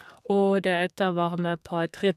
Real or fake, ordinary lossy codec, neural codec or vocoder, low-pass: fake; none; codec, 32 kHz, 1.9 kbps, SNAC; 14.4 kHz